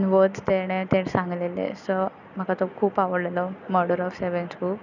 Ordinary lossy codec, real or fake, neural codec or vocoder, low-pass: none; real; none; 7.2 kHz